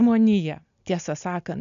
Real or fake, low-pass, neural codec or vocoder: real; 7.2 kHz; none